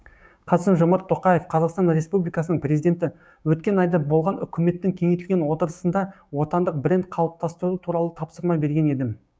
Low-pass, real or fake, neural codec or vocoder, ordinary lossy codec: none; fake; codec, 16 kHz, 6 kbps, DAC; none